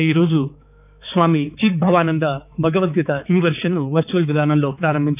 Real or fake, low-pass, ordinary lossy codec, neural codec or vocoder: fake; 3.6 kHz; none; codec, 16 kHz, 4 kbps, X-Codec, HuBERT features, trained on balanced general audio